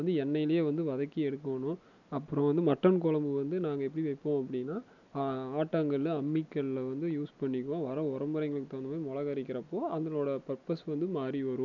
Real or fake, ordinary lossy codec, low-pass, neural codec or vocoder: real; none; 7.2 kHz; none